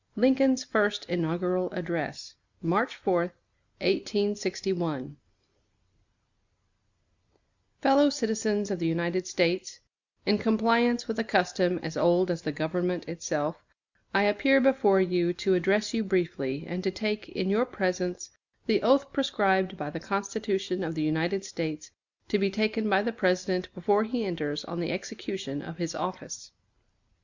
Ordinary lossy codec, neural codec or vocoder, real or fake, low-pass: Opus, 64 kbps; none; real; 7.2 kHz